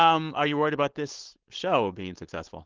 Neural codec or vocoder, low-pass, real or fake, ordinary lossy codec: codec, 16 kHz, 4.8 kbps, FACodec; 7.2 kHz; fake; Opus, 16 kbps